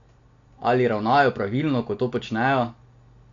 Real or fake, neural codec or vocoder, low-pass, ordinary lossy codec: real; none; 7.2 kHz; AAC, 48 kbps